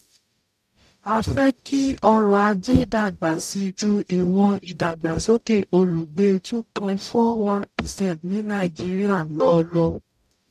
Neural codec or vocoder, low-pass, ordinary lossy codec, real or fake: codec, 44.1 kHz, 0.9 kbps, DAC; 14.4 kHz; none; fake